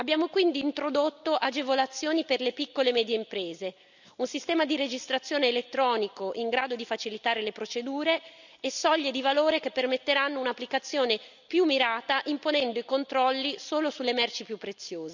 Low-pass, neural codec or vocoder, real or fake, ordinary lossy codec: 7.2 kHz; none; real; none